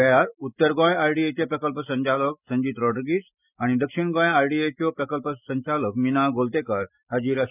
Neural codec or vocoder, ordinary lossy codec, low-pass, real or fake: none; none; 3.6 kHz; real